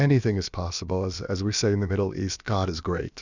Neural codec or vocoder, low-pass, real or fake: codec, 16 kHz, about 1 kbps, DyCAST, with the encoder's durations; 7.2 kHz; fake